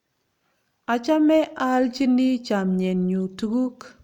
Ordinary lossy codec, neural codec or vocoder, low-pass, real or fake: none; none; 19.8 kHz; real